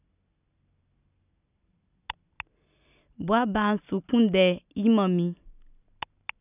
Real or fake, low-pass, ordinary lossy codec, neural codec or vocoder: real; 3.6 kHz; none; none